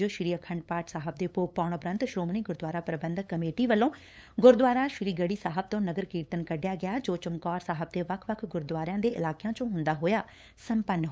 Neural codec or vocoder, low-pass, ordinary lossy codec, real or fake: codec, 16 kHz, 8 kbps, FunCodec, trained on LibriTTS, 25 frames a second; none; none; fake